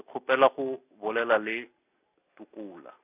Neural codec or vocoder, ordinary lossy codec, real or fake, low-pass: none; none; real; 3.6 kHz